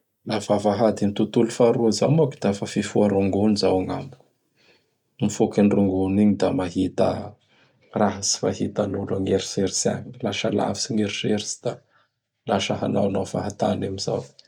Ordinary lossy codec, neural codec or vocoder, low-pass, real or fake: none; none; 19.8 kHz; real